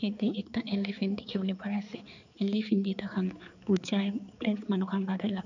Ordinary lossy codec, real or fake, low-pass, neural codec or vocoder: none; fake; 7.2 kHz; codec, 16 kHz, 4 kbps, X-Codec, HuBERT features, trained on balanced general audio